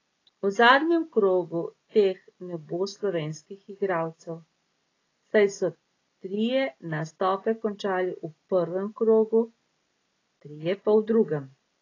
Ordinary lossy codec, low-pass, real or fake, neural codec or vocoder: AAC, 32 kbps; 7.2 kHz; real; none